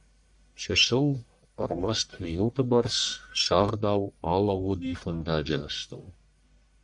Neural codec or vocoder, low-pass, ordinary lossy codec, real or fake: codec, 44.1 kHz, 1.7 kbps, Pupu-Codec; 10.8 kHz; MP3, 96 kbps; fake